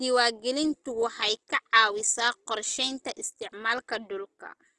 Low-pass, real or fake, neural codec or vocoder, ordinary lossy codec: 10.8 kHz; real; none; Opus, 16 kbps